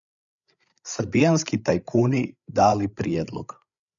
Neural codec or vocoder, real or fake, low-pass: codec, 16 kHz, 8 kbps, FreqCodec, larger model; fake; 7.2 kHz